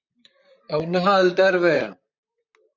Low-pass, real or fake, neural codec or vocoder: 7.2 kHz; fake; vocoder, 44.1 kHz, 128 mel bands, Pupu-Vocoder